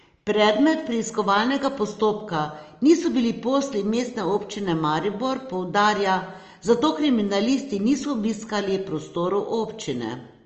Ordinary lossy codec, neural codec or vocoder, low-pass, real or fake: Opus, 24 kbps; none; 7.2 kHz; real